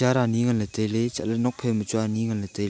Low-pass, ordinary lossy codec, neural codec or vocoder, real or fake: none; none; none; real